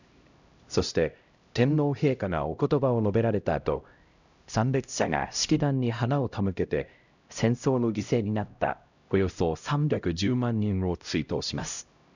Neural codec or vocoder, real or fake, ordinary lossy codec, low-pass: codec, 16 kHz, 0.5 kbps, X-Codec, HuBERT features, trained on LibriSpeech; fake; none; 7.2 kHz